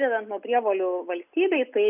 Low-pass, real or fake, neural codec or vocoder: 3.6 kHz; real; none